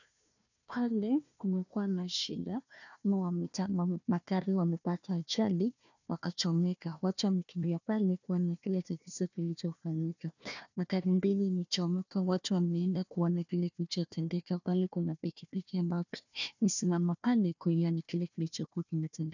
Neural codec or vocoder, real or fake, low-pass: codec, 16 kHz, 1 kbps, FunCodec, trained on Chinese and English, 50 frames a second; fake; 7.2 kHz